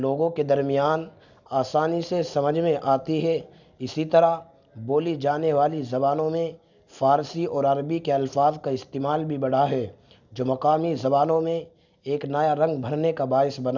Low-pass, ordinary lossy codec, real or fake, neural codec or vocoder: 7.2 kHz; none; real; none